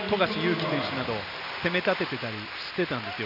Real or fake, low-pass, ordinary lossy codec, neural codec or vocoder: real; 5.4 kHz; none; none